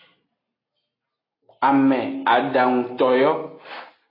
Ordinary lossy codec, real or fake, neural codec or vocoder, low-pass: AAC, 24 kbps; real; none; 5.4 kHz